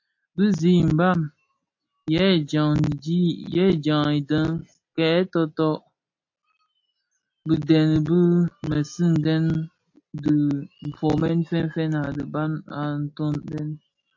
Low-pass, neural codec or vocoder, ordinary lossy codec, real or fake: 7.2 kHz; none; AAC, 48 kbps; real